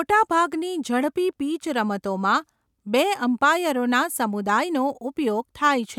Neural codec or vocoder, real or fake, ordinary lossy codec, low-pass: none; real; none; 19.8 kHz